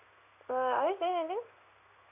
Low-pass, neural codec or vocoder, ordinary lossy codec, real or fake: 3.6 kHz; codec, 16 kHz in and 24 kHz out, 1 kbps, XY-Tokenizer; none; fake